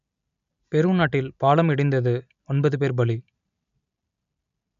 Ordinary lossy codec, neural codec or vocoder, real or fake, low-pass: none; none; real; 7.2 kHz